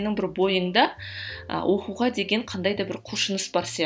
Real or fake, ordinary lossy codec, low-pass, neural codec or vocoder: real; none; none; none